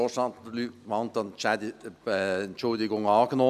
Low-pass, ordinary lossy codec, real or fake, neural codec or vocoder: 14.4 kHz; none; real; none